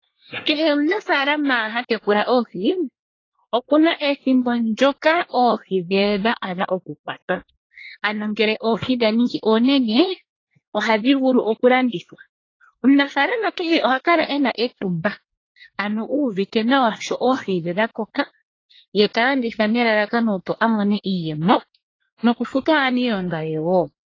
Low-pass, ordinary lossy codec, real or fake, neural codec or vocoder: 7.2 kHz; AAC, 32 kbps; fake; codec, 24 kHz, 1 kbps, SNAC